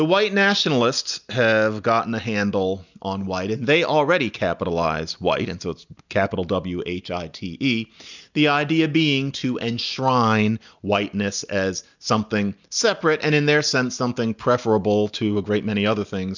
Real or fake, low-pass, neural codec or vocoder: real; 7.2 kHz; none